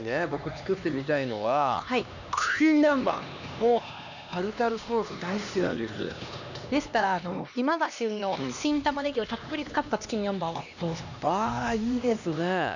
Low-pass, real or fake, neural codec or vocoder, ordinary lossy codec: 7.2 kHz; fake; codec, 16 kHz, 2 kbps, X-Codec, HuBERT features, trained on LibriSpeech; none